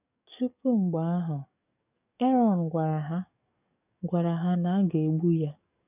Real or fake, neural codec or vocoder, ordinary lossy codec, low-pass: fake; codec, 44.1 kHz, 7.8 kbps, DAC; none; 3.6 kHz